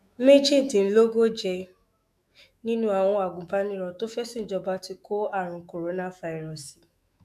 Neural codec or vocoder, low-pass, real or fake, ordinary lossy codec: autoencoder, 48 kHz, 128 numbers a frame, DAC-VAE, trained on Japanese speech; 14.4 kHz; fake; none